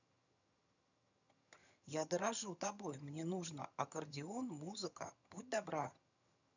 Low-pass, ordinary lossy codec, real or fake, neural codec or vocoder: 7.2 kHz; none; fake; vocoder, 22.05 kHz, 80 mel bands, HiFi-GAN